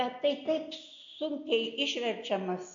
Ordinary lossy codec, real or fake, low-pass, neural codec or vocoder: MP3, 48 kbps; real; 7.2 kHz; none